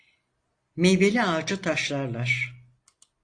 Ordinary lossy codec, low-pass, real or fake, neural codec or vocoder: AAC, 48 kbps; 9.9 kHz; real; none